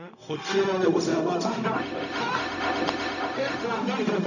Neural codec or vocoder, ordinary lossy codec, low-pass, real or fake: codec, 16 kHz, 0.4 kbps, LongCat-Audio-Codec; none; 7.2 kHz; fake